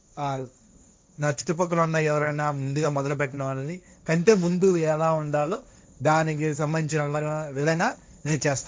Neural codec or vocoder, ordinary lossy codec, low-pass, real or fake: codec, 16 kHz, 1.1 kbps, Voila-Tokenizer; none; none; fake